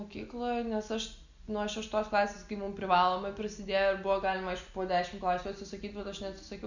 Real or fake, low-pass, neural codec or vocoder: real; 7.2 kHz; none